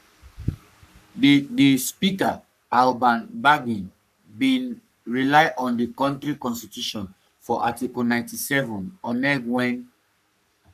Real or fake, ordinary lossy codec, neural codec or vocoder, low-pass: fake; none; codec, 44.1 kHz, 3.4 kbps, Pupu-Codec; 14.4 kHz